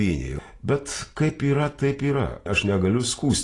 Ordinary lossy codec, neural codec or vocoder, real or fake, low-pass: AAC, 32 kbps; none; real; 10.8 kHz